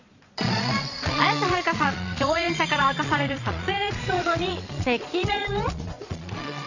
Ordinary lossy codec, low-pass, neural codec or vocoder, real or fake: none; 7.2 kHz; vocoder, 22.05 kHz, 80 mel bands, Vocos; fake